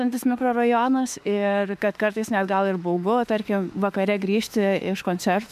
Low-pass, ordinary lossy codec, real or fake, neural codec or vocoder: 14.4 kHz; MP3, 96 kbps; fake; autoencoder, 48 kHz, 32 numbers a frame, DAC-VAE, trained on Japanese speech